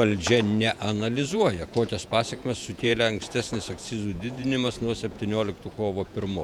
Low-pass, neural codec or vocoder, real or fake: 19.8 kHz; vocoder, 48 kHz, 128 mel bands, Vocos; fake